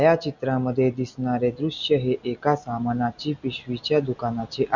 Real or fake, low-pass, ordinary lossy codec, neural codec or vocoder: real; 7.2 kHz; none; none